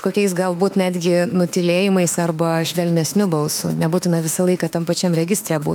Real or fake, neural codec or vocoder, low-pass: fake; autoencoder, 48 kHz, 32 numbers a frame, DAC-VAE, trained on Japanese speech; 19.8 kHz